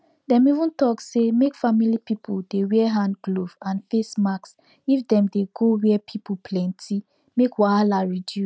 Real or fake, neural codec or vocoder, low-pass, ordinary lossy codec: real; none; none; none